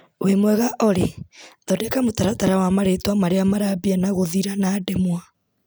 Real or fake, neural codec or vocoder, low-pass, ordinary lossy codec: real; none; none; none